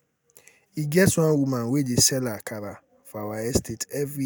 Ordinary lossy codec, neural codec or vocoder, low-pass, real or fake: none; none; none; real